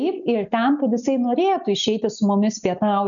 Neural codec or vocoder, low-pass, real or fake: none; 7.2 kHz; real